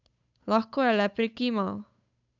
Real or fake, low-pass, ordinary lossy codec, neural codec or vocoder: fake; 7.2 kHz; none; codec, 16 kHz, 8 kbps, FunCodec, trained on Chinese and English, 25 frames a second